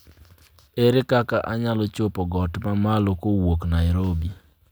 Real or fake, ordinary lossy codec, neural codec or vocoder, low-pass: real; none; none; none